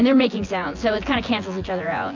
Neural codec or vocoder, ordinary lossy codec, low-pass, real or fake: vocoder, 24 kHz, 100 mel bands, Vocos; AAC, 48 kbps; 7.2 kHz; fake